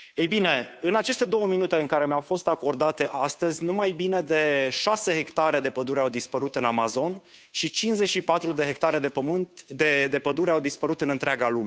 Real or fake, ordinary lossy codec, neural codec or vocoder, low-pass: fake; none; codec, 16 kHz, 2 kbps, FunCodec, trained on Chinese and English, 25 frames a second; none